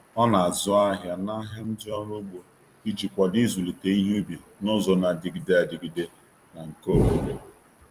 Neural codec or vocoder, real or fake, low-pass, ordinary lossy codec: vocoder, 44.1 kHz, 128 mel bands every 256 samples, BigVGAN v2; fake; 14.4 kHz; Opus, 32 kbps